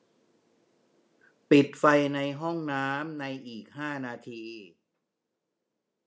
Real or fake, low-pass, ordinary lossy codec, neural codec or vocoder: real; none; none; none